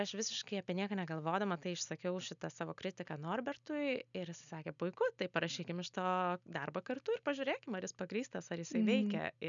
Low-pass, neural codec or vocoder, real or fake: 7.2 kHz; none; real